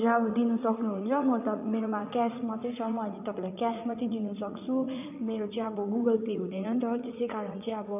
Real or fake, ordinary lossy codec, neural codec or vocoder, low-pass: fake; none; vocoder, 44.1 kHz, 80 mel bands, Vocos; 3.6 kHz